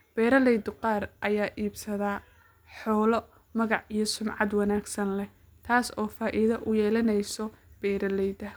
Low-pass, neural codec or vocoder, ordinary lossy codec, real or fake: none; none; none; real